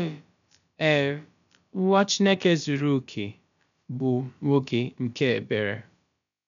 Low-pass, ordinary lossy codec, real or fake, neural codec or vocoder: 7.2 kHz; none; fake; codec, 16 kHz, about 1 kbps, DyCAST, with the encoder's durations